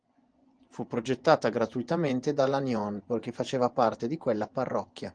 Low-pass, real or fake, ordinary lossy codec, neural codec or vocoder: 9.9 kHz; fake; Opus, 24 kbps; vocoder, 24 kHz, 100 mel bands, Vocos